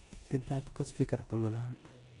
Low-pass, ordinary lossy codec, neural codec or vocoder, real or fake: 10.8 kHz; none; codec, 16 kHz in and 24 kHz out, 0.9 kbps, LongCat-Audio-Codec, four codebook decoder; fake